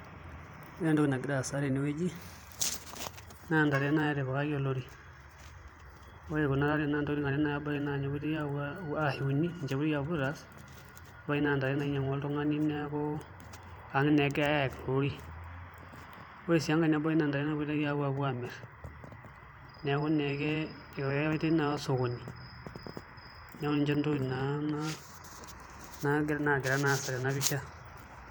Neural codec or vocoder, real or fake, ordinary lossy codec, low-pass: vocoder, 44.1 kHz, 128 mel bands every 512 samples, BigVGAN v2; fake; none; none